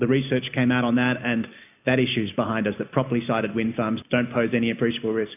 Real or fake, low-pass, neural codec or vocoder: real; 3.6 kHz; none